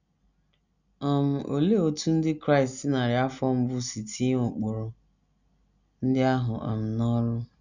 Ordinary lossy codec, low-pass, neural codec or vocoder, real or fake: none; 7.2 kHz; none; real